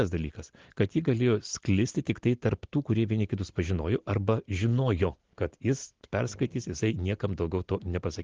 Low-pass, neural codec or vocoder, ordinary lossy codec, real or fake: 7.2 kHz; none; Opus, 16 kbps; real